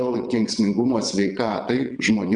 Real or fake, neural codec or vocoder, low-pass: fake; vocoder, 22.05 kHz, 80 mel bands, WaveNeXt; 9.9 kHz